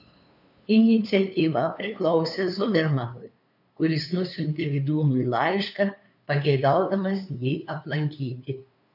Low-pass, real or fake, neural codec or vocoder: 5.4 kHz; fake; codec, 16 kHz, 2 kbps, FunCodec, trained on LibriTTS, 25 frames a second